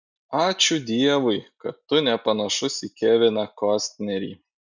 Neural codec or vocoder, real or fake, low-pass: none; real; 7.2 kHz